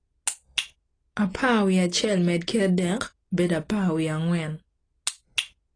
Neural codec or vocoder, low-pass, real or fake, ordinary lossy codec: none; 9.9 kHz; real; AAC, 32 kbps